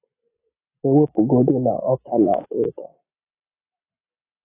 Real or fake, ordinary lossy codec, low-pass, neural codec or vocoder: real; AAC, 16 kbps; 3.6 kHz; none